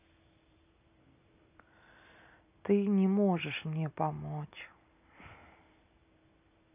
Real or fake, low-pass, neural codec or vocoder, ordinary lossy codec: real; 3.6 kHz; none; none